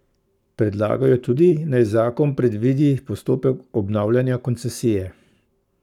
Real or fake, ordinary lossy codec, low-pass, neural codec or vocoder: fake; none; 19.8 kHz; codec, 44.1 kHz, 7.8 kbps, Pupu-Codec